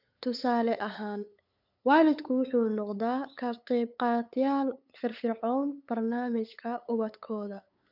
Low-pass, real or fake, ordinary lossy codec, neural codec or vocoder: 5.4 kHz; fake; none; codec, 16 kHz, 8 kbps, FunCodec, trained on LibriTTS, 25 frames a second